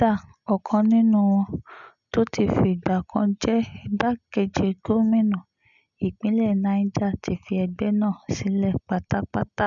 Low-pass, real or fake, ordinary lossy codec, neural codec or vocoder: 7.2 kHz; real; none; none